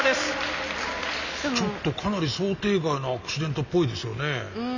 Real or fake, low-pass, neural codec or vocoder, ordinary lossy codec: real; 7.2 kHz; none; none